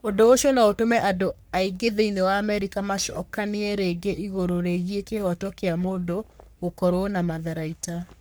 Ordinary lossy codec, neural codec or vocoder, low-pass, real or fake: none; codec, 44.1 kHz, 3.4 kbps, Pupu-Codec; none; fake